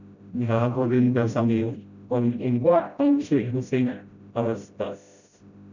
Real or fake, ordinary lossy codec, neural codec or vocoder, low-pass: fake; none; codec, 16 kHz, 0.5 kbps, FreqCodec, smaller model; 7.2 kHz